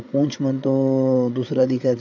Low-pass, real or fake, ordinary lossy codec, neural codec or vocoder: 7.2 kHz; fake; none; codec, 16 kHz, 8 kbps, FreqCodec, larger model